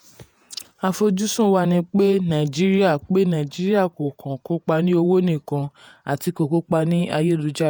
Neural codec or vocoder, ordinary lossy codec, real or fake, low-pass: vocoder, 48 kHz, 128 mel bands, Vocos; none; fake; none